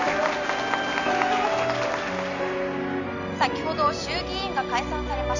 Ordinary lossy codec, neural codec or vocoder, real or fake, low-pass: none; none; real; 7.2 kHz